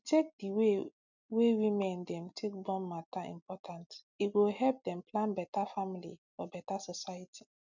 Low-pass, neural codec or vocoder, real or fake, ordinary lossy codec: 7.2 kHz; none; real; none